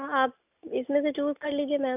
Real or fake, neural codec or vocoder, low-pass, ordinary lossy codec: real; none; 3.6 kHz; none